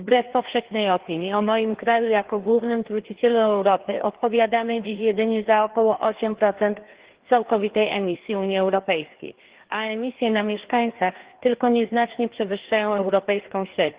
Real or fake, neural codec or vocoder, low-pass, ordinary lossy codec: fake; codec, 16 kHz, 2 kbps, FreqCodec, larger model; 3.6 kHz; Opus, 16 kbps